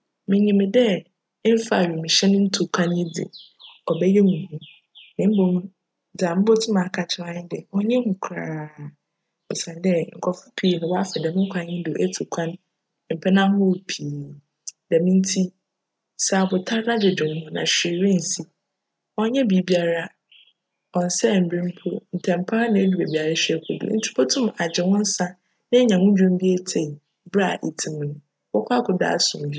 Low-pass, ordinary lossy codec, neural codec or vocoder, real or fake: none; none; none; real